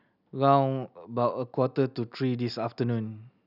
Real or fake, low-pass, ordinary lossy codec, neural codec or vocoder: real; 5.4 kHz; none; none